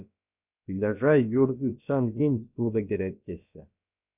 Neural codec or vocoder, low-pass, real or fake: codec, 16 kHz, about 1 kbps, DyCAST, with the encoder's durations; 3.6 kHz; fake